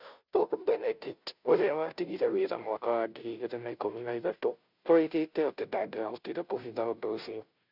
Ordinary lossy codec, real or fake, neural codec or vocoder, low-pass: none; fake; codec, 16 kHz, 0.5 kbps, FunCodec, trained on Chinese and English, 25 frames a second; 5.4 kHz